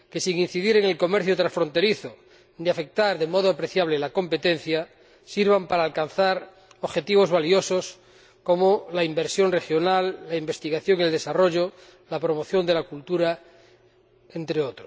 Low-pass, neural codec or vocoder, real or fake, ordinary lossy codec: none; none; real; none